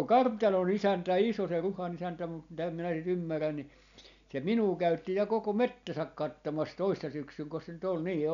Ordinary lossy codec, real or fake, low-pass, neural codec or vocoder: none; real; 7.2 kHz; none